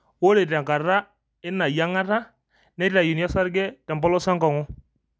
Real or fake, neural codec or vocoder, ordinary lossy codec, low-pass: real; none; none; none